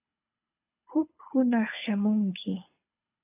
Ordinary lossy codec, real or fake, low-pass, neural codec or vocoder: AAC, 32 kbps; fake; 3.6 kHz; codec, 24 kHz, 6 kbps, HILCodec